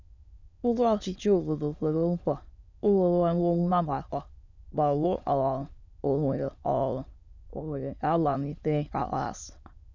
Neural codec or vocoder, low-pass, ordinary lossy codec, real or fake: autoencoder, 22.05 kHz, a latent of 192 numbers a frame, VITS, trained on many speakers; 7.2 kHz; none; fake